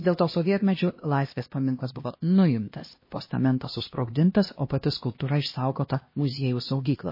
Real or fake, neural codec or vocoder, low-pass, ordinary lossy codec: fake; codec, 16 kHz, 1 kbps, X-Codec, HuBERT features, trained on LibriSpeech; 5.4 kHz; MP3, 24 kbps